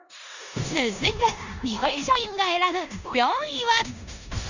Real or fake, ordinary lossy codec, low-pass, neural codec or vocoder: fake; none; 7.2 kHz; codec, 16 kHz in and 24 kHz out, 0.9 kbps, LongCat-Audio-Codec, four codebook decoder